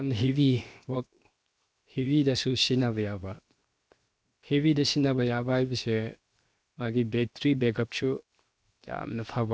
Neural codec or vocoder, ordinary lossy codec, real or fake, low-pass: codec, 16 kHz, 0.7 kbps, FocalCodec; none; fake; none